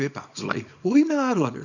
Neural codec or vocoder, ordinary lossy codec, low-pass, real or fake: codec, 24 kHz, 0.9 kbps, WavTokenizer, small release; none; 7.2 kHz; fake